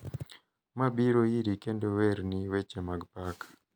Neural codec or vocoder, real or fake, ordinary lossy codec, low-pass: none; real; none; none